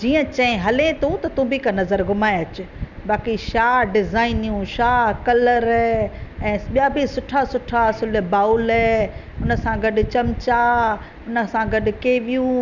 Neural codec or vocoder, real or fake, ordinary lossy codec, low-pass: none; real; none; 7.2 kHz